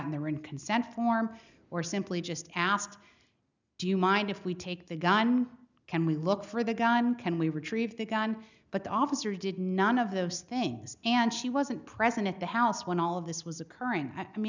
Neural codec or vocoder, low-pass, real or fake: none; 7.2 kHz; real